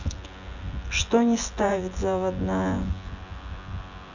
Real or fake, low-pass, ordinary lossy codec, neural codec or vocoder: fake; 7.2 kHz; none; vocoder, 24 kHz, 100 mel bands, Vocos